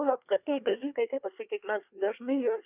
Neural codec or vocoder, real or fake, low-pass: codec, 24 kHz, 1 kbps, SNAC; fake; 3.6 kHz